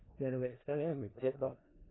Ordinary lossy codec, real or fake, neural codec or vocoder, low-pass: AAC, 16 kbps; fake; codec, 16 kHz in and 24 kHz out, 0.4 kbps, LongCat-Audio-Codec, four codebook decoder; 7.2 kHz